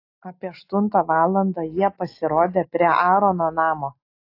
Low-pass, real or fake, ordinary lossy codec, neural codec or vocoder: 5.4 kHz; real; AAC, 32 kbps; none